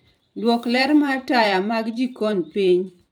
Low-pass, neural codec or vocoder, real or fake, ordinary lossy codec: none; vocoder, 44.1 kHz, 128 mel bands every 512 samples, BigVGAN v2; fake; none